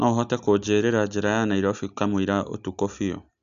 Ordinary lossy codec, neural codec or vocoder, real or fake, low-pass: MP3, 96 kbps; none; real; 7.2 kHz